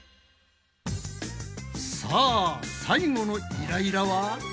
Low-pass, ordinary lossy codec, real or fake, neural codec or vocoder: none; none; real; none